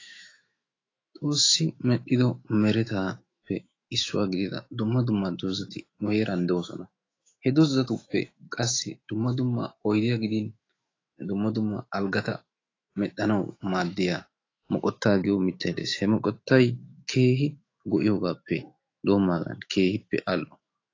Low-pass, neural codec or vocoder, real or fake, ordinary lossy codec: 7.2 kHz; autoencoder, 48 kHz, 128 numbers a frame, DAC-VAE, trained on Japanese speech; fake; AAC, 32 kbps